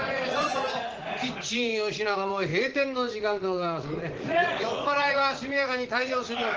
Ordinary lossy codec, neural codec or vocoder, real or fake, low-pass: Opus, 16 kbps; vocoder, 44.1 kHz, 80 mel bands, Vocos; fake; 7.2 kHz